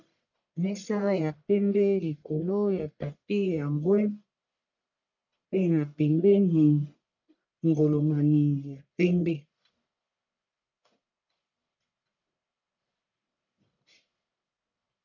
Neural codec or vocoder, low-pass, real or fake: codec, 44.1 kHz, 1.7 kbps, Pupu-Codec; 7.2 kHz; fake